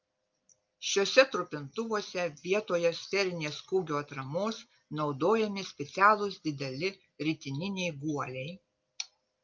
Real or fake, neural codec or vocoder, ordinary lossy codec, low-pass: real; none; Opus, 24 kbps; 7.2 kHz